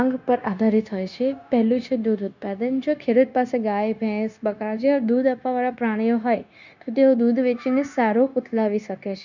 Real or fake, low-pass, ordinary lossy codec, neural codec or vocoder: fake; 7.2 kHz; none; codec, 16 kHz, 0.9 kbps, LongCat-Audio-Codec